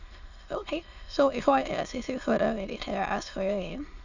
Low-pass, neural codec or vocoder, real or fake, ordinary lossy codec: 7.2 kHz; autoencoder, 22.05 kHz, a latent of 192 numbers a frame, VITS, trained on many speakers; fake; none